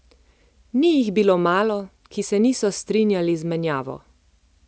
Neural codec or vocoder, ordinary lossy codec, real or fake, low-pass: none; none; real; none